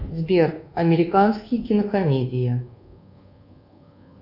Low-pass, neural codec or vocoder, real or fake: 5.4 kHz; codec, 24 kHz, 1.2 kbps, DualCodec; fake